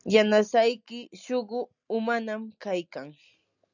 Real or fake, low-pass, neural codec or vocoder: real; 7.2 kHz; none